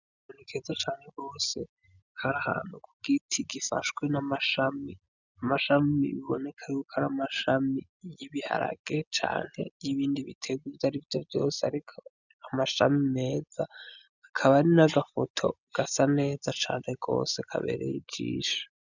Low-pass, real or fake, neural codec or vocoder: 7.2 kHz; real; none